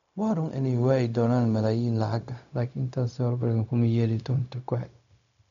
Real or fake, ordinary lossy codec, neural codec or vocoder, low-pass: fake; none; codec, 16 kHz, 0.4 kbps, LongCat-Audio-Codec; 7.2 kHz